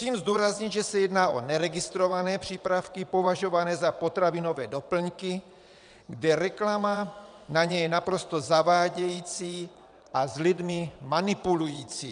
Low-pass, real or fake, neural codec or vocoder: 9.9 kHz; fake; vocoder, 22.05 kHz, 80 mel bands, WaveNeXt